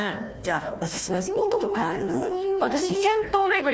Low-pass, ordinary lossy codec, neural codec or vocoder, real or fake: none; none; codec, 16 kHz, 1 kbps, FunCodec, trained on Chinese and English, 50 frames a second; fake